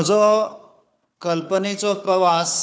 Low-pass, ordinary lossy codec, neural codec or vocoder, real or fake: none; none; codec, 16 kHz, 4 kbps, FunCodec, trained on Chinese and English, 50 frames a second; fake